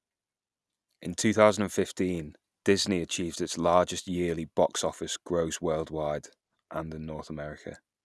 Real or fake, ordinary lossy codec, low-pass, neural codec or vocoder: real; none; none; none